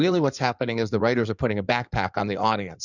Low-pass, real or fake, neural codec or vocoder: 7.2 kHz; fake; codec, 16 kHz in and 24 kHz out, 2.2 kbps, FireRedTTS-2 codec